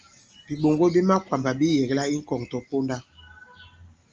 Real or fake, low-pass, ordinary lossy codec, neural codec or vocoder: real; 7.2 kHz; Opus, 24 kbps; none